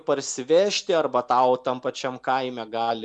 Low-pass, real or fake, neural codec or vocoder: 10.8 kHz; real; none